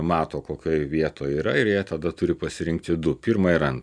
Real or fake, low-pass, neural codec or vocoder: real; 9.9 kHz; none